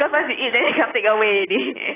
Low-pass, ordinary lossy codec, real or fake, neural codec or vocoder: 3.6 kHz; AAC, 16 kbps; real; none